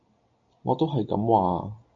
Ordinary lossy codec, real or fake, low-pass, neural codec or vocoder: AAC, 48 kbps; real; 7.2 kHz; none